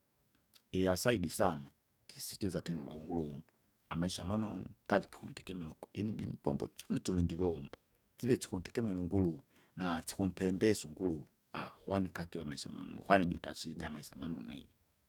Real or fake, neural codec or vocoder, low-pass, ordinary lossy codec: fake; codec, 44.1 kHz, 2.6 kbps, DAC; 19.8 kHz; none